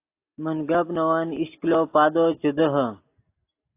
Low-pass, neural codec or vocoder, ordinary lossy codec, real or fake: 3.6 kHz; none; AAC, 24 kbps; real